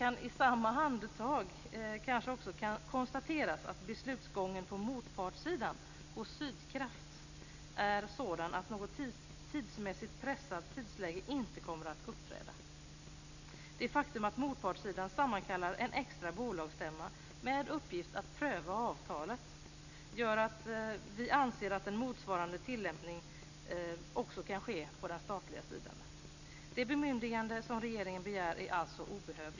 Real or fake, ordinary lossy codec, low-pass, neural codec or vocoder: real; none; 7.2 kHz; none